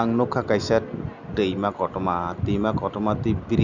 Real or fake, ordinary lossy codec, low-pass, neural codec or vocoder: real; none; 7.2 kHz; none